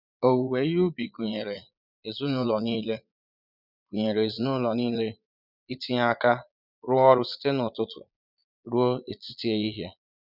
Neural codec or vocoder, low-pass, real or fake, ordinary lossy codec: vocoder, 22.05 kHz, 80 mel bands, Vocos; 5.4 kHz; fake; none